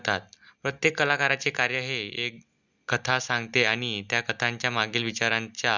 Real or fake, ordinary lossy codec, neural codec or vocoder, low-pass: real; Opus, 64 kbps; none; 7.2 kHz